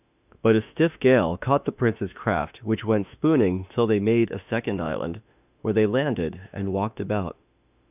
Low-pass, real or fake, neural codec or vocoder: 3.6 kHz; fake; autoencoder, 48 kHz, 32 numbers a frame, DAC-VAE, trained on Japanese speech